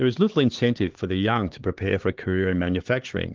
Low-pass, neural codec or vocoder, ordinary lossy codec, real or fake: 7.2 kHz; codec, 16 kHz, 4 kbps, X-Codec, HuBERT features, trained on LibriSpeech; Opus, 16 kbps; fake